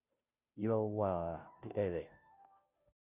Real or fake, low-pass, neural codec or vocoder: fake; 3.6 kHz; codec, 16 kHz, 0.5 kbps, FunCodec, trained on Chinese and English, 25 frames a second